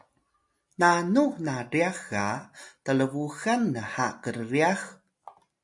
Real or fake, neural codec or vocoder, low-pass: real; none; 10.8 kHz